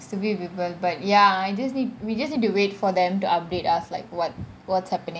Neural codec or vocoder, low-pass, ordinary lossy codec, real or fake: none; none; none; real